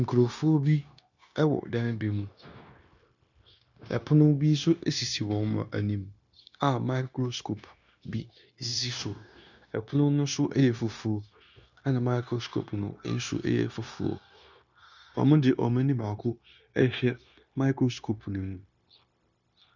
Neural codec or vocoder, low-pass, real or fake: codec, 16 kHz, 0.9 kbps, LongCat-Audio-Codec; 7.2 kHz; fake